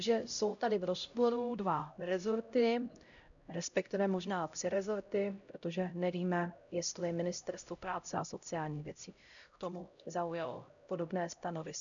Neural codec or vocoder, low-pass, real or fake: codec, 16 kHz, 0.5 kbps, X-Codec, HuBERT features, trained on LibriSpeech; 7.2 kHz; fake